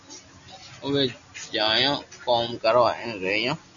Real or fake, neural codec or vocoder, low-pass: real; none; 7.2 kHz